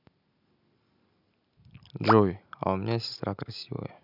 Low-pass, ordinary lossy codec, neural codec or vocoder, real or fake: 5.4 kHz; none; none; real